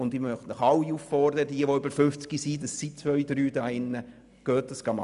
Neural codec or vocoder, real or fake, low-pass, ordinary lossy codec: none; real; 10.8 kHz; none